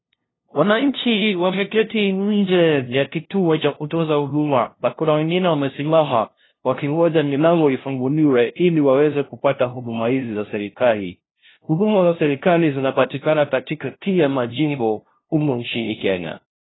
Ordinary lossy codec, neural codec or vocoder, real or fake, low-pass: AAC, 16 kbps; codec, 16 kHz, 0.5 kbps, FunCodec, trained on LibriTTS, 25 frames a second; fake; 7.2 kHz